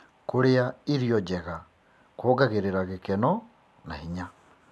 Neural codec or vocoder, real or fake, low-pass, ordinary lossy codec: none; real; none; none